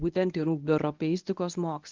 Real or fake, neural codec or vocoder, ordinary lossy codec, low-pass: fake; codec, 24 kHz, 0.9 kbps, WavTokenizer, medium speech release version 1; Opus, 32 kbps; 7.2 kHz